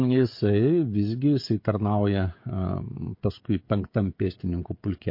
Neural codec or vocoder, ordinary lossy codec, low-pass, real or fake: codec, 16 kHz, 16 kbps, FreqCodec, smaller model; MP3, 32 kbps; 5.4 kHz; fake